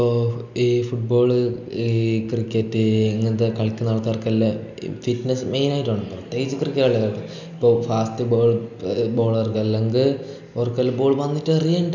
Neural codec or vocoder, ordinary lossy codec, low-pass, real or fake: none; none; 7.2 kHz; real